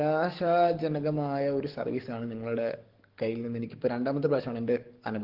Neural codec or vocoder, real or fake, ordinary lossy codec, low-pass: codec, 44.1 kHz, 7.8 kbps, DAC; fake; Opus, 16 kbps; 5.4 kHz